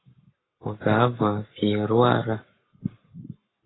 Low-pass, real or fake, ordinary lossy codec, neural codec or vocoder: 7.2 kHz; fake; AAC, 16 kbps; codec, 44.1 kHz, 7.8 kbps, Pupu-Codec